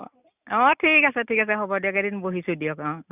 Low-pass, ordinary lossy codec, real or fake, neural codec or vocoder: 3.6 kHz; none; real; none